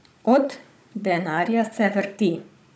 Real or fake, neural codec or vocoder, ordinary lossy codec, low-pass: fake; codec, 16 kHz, 16 kbps, FunCodec, trained on Chinese and English, 50 frames a second; none; none